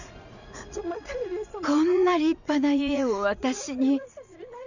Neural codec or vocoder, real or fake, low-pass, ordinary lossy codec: vocoder, 44.1 kHz, 80 mel bands, Vocos; fake; 7.2 kHz; none